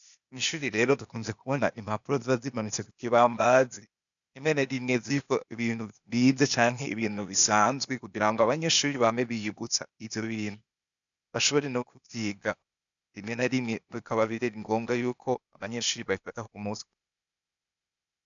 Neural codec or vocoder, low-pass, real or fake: codec, 16 kHz, 0.8 kbps, ZipCodec; 7.2 kHz; fake